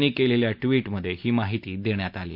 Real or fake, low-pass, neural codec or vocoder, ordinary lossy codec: real; 5.4 kHz; none; MP3, 48 kbps